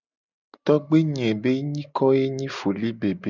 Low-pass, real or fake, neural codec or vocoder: 7.2 kHz; real; none